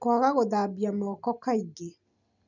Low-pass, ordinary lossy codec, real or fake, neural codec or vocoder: 7.2 kHz; none; real; none